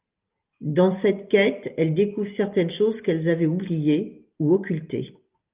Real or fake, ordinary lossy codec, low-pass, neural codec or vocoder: real; Opus, 24 kbps; 3.6 kHz; none